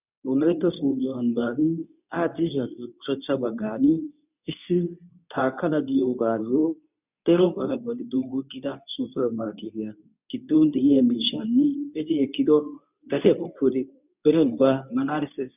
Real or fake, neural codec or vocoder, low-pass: fake; codec, 24 kHz, 0.9 kbps, WavTokenizer, medium speech release version 2; 3.6 kHz